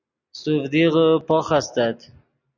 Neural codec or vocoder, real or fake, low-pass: none; real; 7.2 kHz